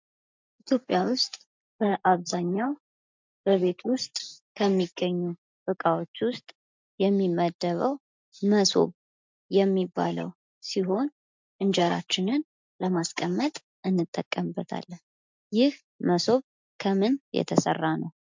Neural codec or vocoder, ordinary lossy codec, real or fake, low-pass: none; MP3, 64 kbps; real; 7.2 kHz